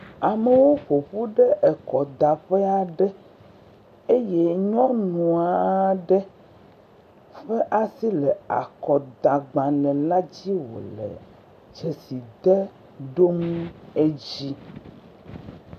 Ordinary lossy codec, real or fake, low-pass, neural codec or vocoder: MP3, 64 kbps; real; 14.4 kHz; none